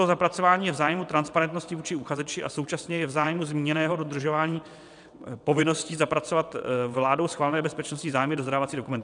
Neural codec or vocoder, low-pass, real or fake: vocoder, 22.05 kHz, 80 mel bands, WaveNeXt; 9.9 kHz; fake